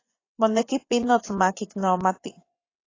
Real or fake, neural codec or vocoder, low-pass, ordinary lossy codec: fake; vocoder, 24 kHz, 100 mel bands, Vocos; 7.2 kHz; AAC, 32 kbps